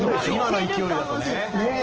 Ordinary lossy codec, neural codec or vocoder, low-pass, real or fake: Opus, 16 kbps; none; 7.2 kHz; real